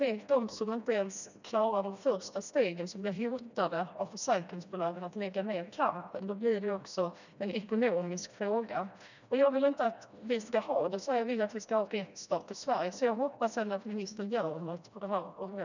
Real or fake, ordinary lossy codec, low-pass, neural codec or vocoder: fake; none; 7.2 kHz; codec, 16 kHz, 1 kbps, FreqCodec, smaller model